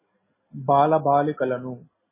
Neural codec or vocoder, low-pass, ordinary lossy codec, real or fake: none; 3.6 kHz; MP3, 16 kbps; real